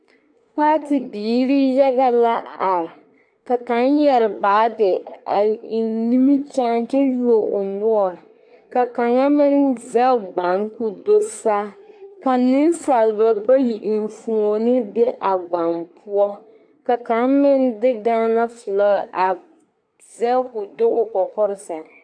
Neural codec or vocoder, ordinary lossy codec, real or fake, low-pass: codec, 24 kHz, 1 kbps, SNAC; AAC, 64 kbps; fake; 9.9 kHz